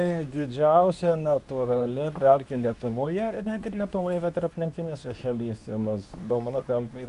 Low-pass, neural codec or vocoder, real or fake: 10.8 kHz; codec, 24 kHz, 0.9 kbps, WavTokenizer, medium speech release version 2; fake